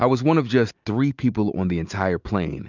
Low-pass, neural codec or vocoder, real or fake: 7.2 kHz; none; real